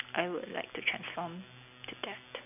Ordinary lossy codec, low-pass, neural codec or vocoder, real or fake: none; 3.6 kHz; none; real